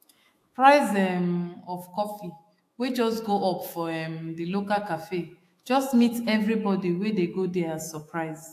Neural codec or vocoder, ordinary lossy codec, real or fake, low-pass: autoencoder, 48 kHz, 128 numbers a frame, DAC-VAE, trained on Japanese speech; AAC, 64 kbps; fake; 14.4 kHz